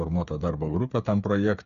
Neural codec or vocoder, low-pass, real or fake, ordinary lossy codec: codec, 16 kHz, 8 kbps, FreqCodec, smaller model; 7.2 kHz; fake; Opus, 64 kbps